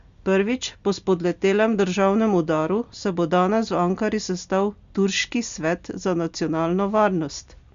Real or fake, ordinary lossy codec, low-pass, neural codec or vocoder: real; Opus, 64 kbps; 7.2 kHz; none